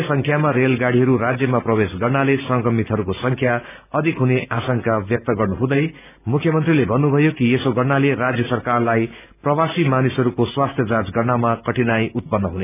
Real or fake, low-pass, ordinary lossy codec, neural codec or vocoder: real; 3.6 kHz; none; none